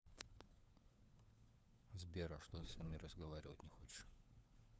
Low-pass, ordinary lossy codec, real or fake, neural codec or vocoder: none; none; fake; codec, 16 kHz, 4 kbps, FunCodec, trained on LibriTTS, 50 frames a second